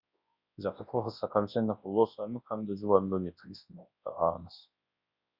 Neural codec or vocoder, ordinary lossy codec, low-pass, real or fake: codec, 24 kHz, 0.9 kbps, WavTokenizer, large speech release; Opus, 64 kbps; 5.4 kHz; fake